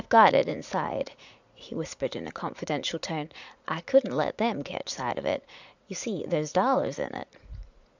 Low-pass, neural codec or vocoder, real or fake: 7.2 kHz; vocoder, 44.1 kHz, 80 mel bands, Vocos; fake